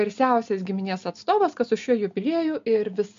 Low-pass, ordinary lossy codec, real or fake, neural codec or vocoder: 7.2 kHz; MP3, 48 kbps; real; none